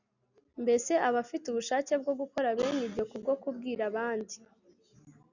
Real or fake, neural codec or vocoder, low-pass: real; none; 7.2 kHz